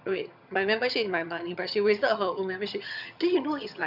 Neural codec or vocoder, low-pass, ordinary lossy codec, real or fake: vocoder, 22.05 kHz, 80 mel bands, HiFi-GAN; 5.4 kHz; none; fake